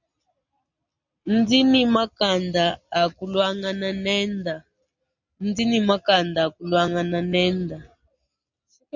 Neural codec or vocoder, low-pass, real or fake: none; 7.2 kHz; real